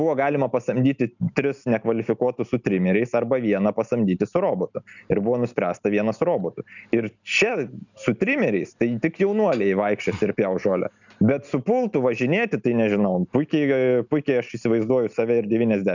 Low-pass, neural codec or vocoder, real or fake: 7.2 kHz; none; real